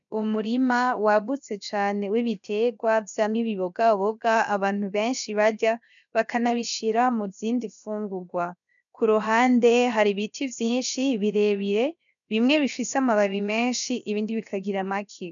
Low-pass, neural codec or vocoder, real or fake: 7.2 kHz; codec, 16 kHz, 0.7 kbps, FocalCodec; fake